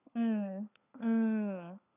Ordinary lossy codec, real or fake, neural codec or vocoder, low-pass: none; fake; codec, 16 kHz, 4 kbps, FunCodec, trained on LibriTTS, 50 frames a second; 3.6 kHz